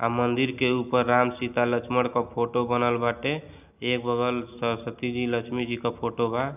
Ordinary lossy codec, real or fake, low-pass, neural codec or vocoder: none; real; 3.6 kHz; none